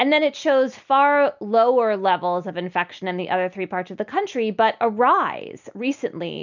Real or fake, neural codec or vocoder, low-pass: real; none; 7.2 kHz